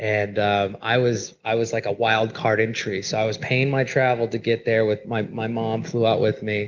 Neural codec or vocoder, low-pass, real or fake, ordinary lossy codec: none; 7.2 kHz; real; Opus, 24 kbps